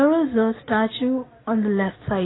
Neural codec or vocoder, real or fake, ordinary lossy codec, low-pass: none; real; AAC, 16 kbps; 7.2 kHz